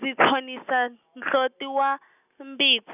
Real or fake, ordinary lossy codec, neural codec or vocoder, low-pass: real; none; none; 3.6 kHz